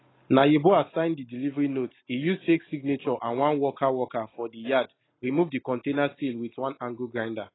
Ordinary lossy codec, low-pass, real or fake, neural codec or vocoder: AAC, 16 kbps; 7.2 kHz; real; none